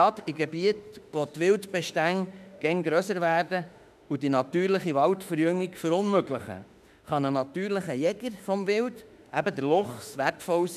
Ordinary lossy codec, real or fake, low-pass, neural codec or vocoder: none; fake; 14.4 kHz; autoencoder, 48 kHz, 32 numbers a frame, DAC-VAE, trained on Japanese speech